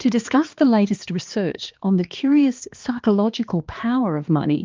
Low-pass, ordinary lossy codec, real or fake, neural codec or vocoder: 7.2 kHz; Opus, 32 kbps; fake; codec, 16 kHz, 2 kbps, X-Codec, HuBERT features, trained on balanced general audio